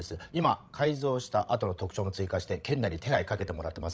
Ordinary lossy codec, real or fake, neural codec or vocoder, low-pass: none; fake; codec, 16 kHz, 16 kbps, FreqCodec, larger model; none